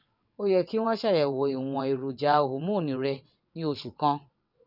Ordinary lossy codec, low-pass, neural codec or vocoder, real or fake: none; 5.4 kHz; vocoder, 22.05 kHz, 80 mel bands, WaveNeXt; fake